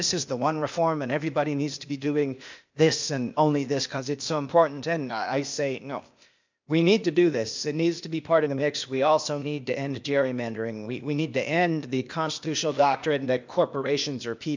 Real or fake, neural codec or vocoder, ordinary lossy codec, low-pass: fake; codec, 16 kHz, 0.8 kbps, ZipCodec; MP3, 64 kbps; 7.2 kHz